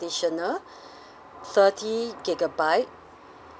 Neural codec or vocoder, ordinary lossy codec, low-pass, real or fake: none; none; none; real